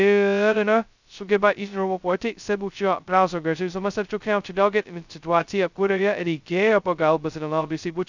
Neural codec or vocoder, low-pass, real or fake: codec, 16 kHz, 0.2 kbps, FocalCodec; 7.2 kHz; fake